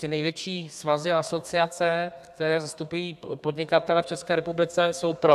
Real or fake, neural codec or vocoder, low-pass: fake; codec, 32 kHz, 1.9 kbps, SNAC; 14.4 kHz